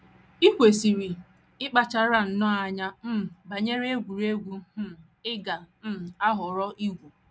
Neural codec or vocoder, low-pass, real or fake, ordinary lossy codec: none; none; real; none